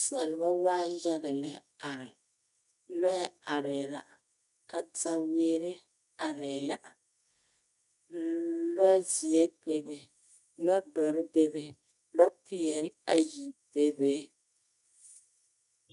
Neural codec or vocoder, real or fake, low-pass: codec, 24 kHz, 0.9 kbps, WavTokenizer, medium music audio release; fake; 10.8 kHz